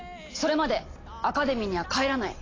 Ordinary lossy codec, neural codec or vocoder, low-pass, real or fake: AAC, 32 kbps; none; 7.2 kHz; real